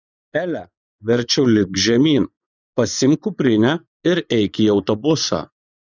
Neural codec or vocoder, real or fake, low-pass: vocoder, 24 kHz, 100 mel bands, Vocos; fake; 7.2 kHz